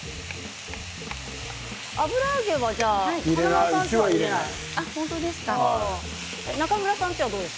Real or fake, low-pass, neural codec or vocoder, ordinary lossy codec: real; none; none; none